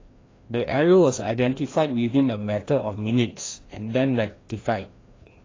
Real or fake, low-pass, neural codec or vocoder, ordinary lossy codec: fake; 7.2 kHz; codec, 16 kHz, 1 kbps, FreqCodec, larger model; AAC, 32 kbps